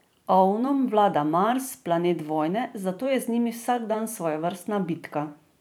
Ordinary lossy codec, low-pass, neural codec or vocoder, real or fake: none; none; vocoder, 44.1 kHz, 128 mel bands every 512 samples, BigVGAN v2; fake